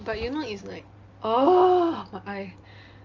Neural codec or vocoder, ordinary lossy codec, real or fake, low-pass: none; Opus, 32 kbps; real; 7.2 kHz